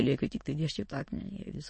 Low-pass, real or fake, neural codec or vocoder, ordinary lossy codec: 9.9 kHz; fake; autoencoder, 22.05 kHz, a latent of 192 numbers a frame, VITS, trained on many speakers; MP3, 32 kbps